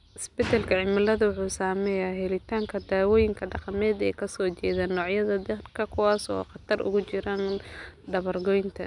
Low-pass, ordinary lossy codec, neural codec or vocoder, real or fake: 10.8 kHz; none; none; real